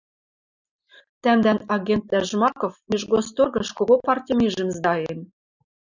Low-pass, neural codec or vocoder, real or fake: 7.2 kHz; none; real